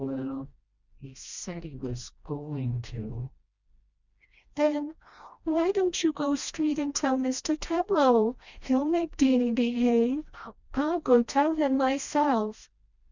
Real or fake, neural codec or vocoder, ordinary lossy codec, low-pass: fake; codec, 16 kHz, 1 kbps, FreqCodec, smaller model; Opus, 64 kbps; 7.2 kHz